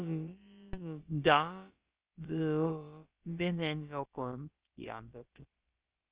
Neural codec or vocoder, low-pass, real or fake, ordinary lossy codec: codec, 16 kHz, about 1 kbps, DyCAST, with the encoder's durations; 3.6 kHz; fake; Opus, 24 kbps